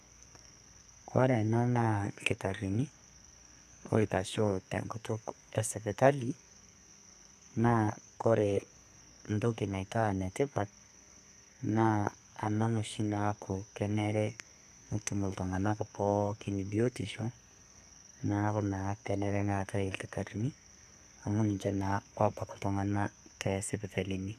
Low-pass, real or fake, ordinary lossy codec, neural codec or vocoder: 14.4 kHz; fake; none; codec, 44.1 kHz, 2.6 kbps, SNAC